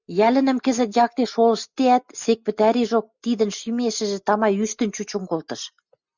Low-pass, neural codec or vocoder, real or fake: 7.2 kHz; none; real